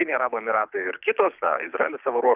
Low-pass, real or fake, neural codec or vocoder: 3.6 kHz; fake; codec, 24 kHz, 6 kbps, HILCodec